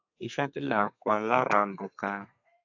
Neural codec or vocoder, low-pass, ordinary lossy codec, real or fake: codec, 32 kHz, 1.9 kbps, SNAC; 7.2 kHz; AAC, 48 kbps; fake